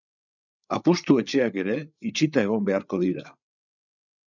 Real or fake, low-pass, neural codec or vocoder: fake; 7.2 kHz; codec, 16 kHz, 8 kbps, FreqCodec, larger model